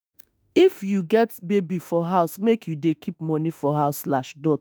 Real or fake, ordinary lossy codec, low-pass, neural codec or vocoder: fake; none; none; autoencoder, 48 kHz, 32 numbers a frame, DAC-VAE, trained on Japanese speech